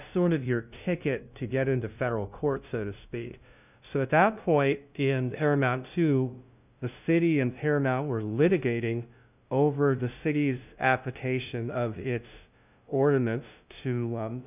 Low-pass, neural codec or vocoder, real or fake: 3.6 kHz; codec, 16 kHz, 0.5 kbps, FunCodec, trained on LibriTTS, 25 frames a second; fake